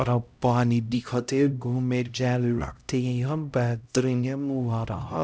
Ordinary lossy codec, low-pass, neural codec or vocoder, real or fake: none; none; codec, 16 kHz, 0.5 kbps, X-Codec, HuBERT features, trained on LibriSpeech; fake